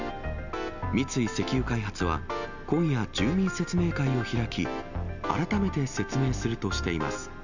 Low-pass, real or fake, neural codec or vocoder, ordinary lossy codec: 7.2 kHz; real; none; none